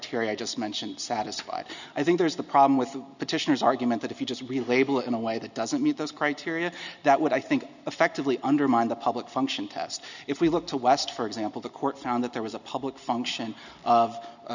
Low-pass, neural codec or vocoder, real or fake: 7.2 kHz; none; real